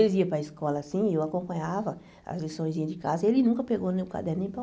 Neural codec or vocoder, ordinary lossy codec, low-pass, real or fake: none; none; none; real